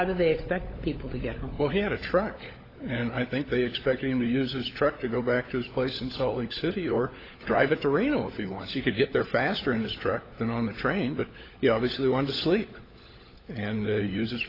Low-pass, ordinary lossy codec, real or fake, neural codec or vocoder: 5.4 kHz; AAC, 24 kbps; fake; codec, 16 kHz, 16 kbps, FunCodec, trained on LibriTTS, 50 frames a second